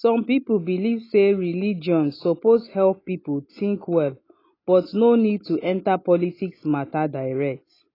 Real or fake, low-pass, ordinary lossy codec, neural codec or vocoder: real; 5.4 kHz; AAC, 24 kbps; none